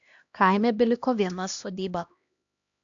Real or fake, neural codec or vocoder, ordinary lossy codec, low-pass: fake; codec, 16 kHz, 1 kbps, X-Codec, HuBERT features, trained on LibriSpeech; AAC, 64 kbps; 7.2 kHz